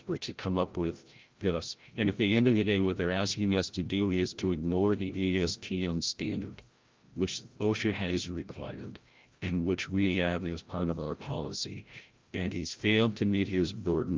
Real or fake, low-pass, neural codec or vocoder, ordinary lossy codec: fake; 7.2 kHz; codec, 16 kHz, 0.5 kbps, FreqCodec, larger model; Opus, 32 kbps